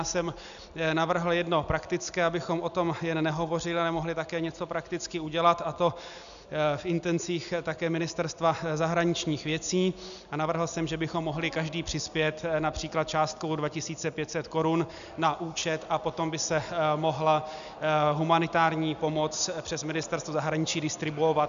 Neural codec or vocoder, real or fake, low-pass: none; real; 7.2 kHz